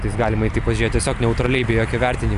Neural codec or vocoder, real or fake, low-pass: none; real; 10.8 kHz